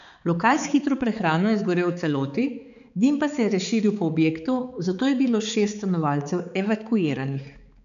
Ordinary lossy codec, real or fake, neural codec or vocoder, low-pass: none; fake; codec, 16 kHz, 4 kbps, X-Codec, HuBERT features, trained on balanced general audio; 7.2 kHz